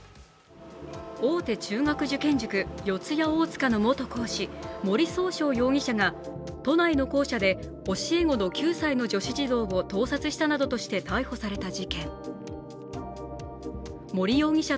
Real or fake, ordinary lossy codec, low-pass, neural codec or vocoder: real; none; none; none